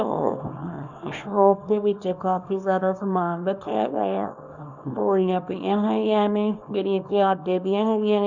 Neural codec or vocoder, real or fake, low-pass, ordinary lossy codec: codec, 24 kHz, 0.9 kbps, WavTokenizer, small release; fake; 7.2 kHz; none